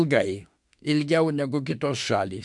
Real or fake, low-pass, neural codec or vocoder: fake; 10.8 kHz; autoencoder, 48 kHz, 32 numbers a frame, DAC-VAE, trained on Japanese speech